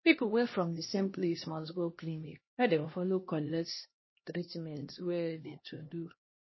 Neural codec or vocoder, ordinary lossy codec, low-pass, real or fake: codec, 16 kHz, 1 kbps, X-Codec, HuBERT features, trained on LibriSpeech; MP3, 24 kbps; 7.2 kHz; fake